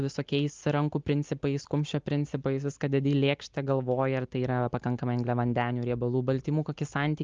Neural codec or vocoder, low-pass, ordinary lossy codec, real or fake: none; 7.2 kHz; Opus, 24 kbps; real